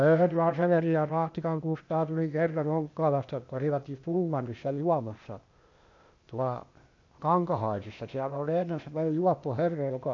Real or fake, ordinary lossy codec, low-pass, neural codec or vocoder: fake; MP3, 48 kbps; 7.2 kHz; codec, 16 kHz, 0.8 kbps, ZipCodec